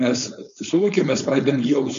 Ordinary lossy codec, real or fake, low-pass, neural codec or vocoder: MP3, 64 kbps; fake; 7.2 kHz; codec, 16 kHz, 4.8 kbps, FACodec